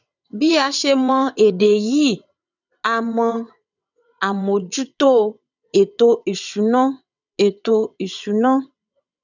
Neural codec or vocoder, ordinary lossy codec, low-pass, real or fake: vocoder, 22.05 kHz, 80 mel bands, WaveNeXt; none; 7.2 kHz; fake